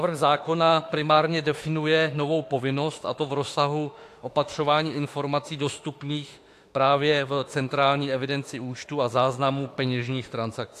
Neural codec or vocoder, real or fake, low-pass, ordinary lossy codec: autoencoder, 48 kHz, 32 numbers a frame, DAC-VAE, trained on Japanese speech; fake; 14.4 kHz; AAC, 64 kbps